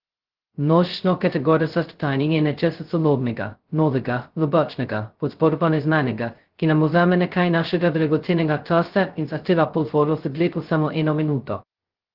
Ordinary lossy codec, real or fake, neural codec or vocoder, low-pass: Opus, 16 kbps; fake; codec, 16 kHz, 0.2 kbps, FocalCodec; 5.4 kHz